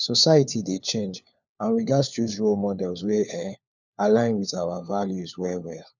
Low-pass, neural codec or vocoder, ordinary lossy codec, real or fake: 7.2 kHz; codec, 16 kHz, 4 kbps, FunCodec, trained on LibriTTS, 50 frames a second; none; fake